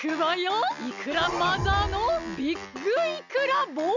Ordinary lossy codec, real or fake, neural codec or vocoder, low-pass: none; real; none; 7.2 kHz